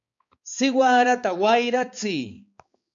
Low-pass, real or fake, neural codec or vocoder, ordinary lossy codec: 7.2 kHz; fake; codec, 16 kHz, 4 kbps, X-Codec, HuBERT features, trained on balanced general audio; MP3, 48 kbps